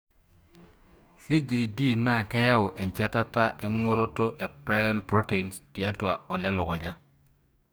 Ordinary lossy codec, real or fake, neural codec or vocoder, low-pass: none; fake; codec, 44.1 kHz, 2.6 kbps, DAC; none